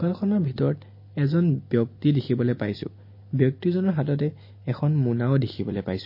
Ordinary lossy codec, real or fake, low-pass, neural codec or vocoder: MP3, 24 kbps; real; 5.4 kHz; none